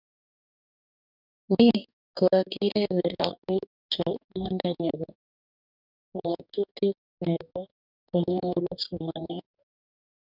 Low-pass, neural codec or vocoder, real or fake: 5.4 kHz; codec, 44.1 kHz, 2.6 kbps, DAC; fake